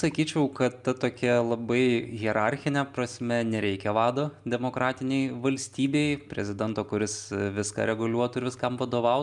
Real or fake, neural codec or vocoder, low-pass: real; none; 10.8 kHz